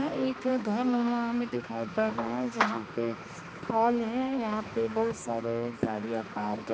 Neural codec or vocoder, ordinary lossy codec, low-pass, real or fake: codec, 16 kHz, 2 kbps, X-Codec, HuBERT features, trained on balanced general audio; none; none; fake